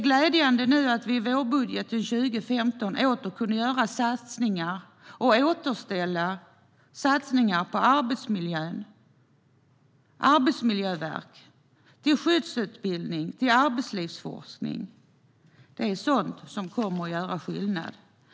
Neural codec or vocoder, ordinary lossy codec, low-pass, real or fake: none; none; none; real